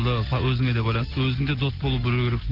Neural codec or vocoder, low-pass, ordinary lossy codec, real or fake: none; 5.4 kHz; Opus, 16 kbps; real